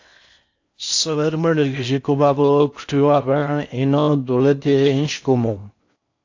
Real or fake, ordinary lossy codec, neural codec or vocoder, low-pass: fake; AAC, 48 kbps; codec, 16 kHz in and 24 kHz out, 0.6 kbps, FocalCodec, streaming, 4096 codes; 7.2 kHz